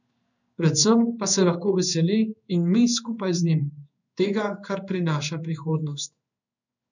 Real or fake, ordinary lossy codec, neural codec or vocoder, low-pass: fake; none; codec, 16 kHz in and 24 kHz out, 1 kbps, XY-Tokenizer; 7.2 kHz